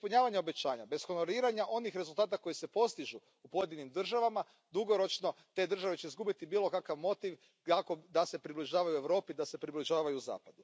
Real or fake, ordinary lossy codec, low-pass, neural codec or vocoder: real; none; none; none